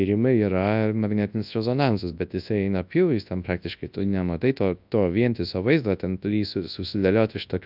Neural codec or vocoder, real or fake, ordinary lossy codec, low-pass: codec, 24 kHz, 0.9 kbps, WavTokenizer, large speech release; fake; AAC, 48 kbps; 5.4 kHz